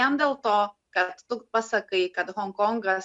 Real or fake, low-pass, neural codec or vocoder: real; 10.8 kHz; none